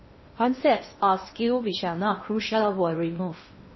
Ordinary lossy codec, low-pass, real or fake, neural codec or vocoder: MP3, 24 kbps; 7.2 kHz; fake; codec, 16 kHz in and 24 kHz out, 0.6 kbps, FocalCodec, streaming, 2048 codes